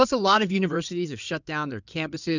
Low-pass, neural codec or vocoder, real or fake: 7.2 kHz; codec, 16 kHz in and 24 kHz out, 2.2 kbps, FireRedTTS-2 codec; fake